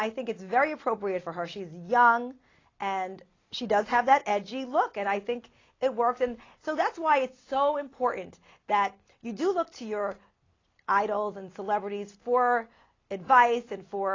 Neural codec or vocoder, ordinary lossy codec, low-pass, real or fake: none; AAC, 32 kbps; 7.2 kHz; real